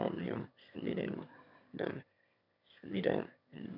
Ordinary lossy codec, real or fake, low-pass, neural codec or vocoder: none; fake; 5.4 kHz; autoencoder, 22.05 kHz, a latent of 192 numbers a frame, VITS, trained on one speaker